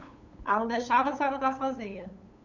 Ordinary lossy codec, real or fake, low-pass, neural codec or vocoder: none; fake; 7.2 kHz; codec, 16 kHz, 8 kbps, FunCodec, trained on LibriTTS, 25 frames a second